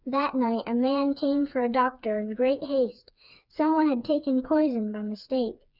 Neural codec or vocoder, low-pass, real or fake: codec, 16 kHz, 4 kbps, FreqCodec, smaller model; 5.4 kHz; fake